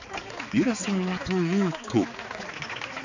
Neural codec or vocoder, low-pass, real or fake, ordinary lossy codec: codec, 16 kHz, 4 kbps, X-Codec, HuBERT features, trained on balanced general audio; 7.2 kHz; fake; none